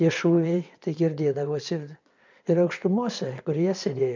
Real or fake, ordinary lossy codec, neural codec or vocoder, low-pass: fake; MP3, 64 kbps; vocoder, 22.05 kHz, 80 mel bands, WaveNeXt; 7.2 kHz